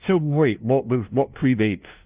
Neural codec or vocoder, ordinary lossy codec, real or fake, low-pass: codec, 16 kHz, 0.5 kbps, FunCodec, trained on LibriTTS, 25 frames a second; Opus, 32 kbps; fake; 3.6 kHz